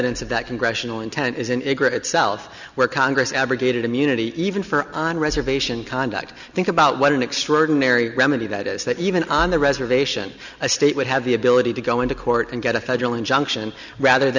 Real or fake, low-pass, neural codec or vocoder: real; 7.2 kHz; none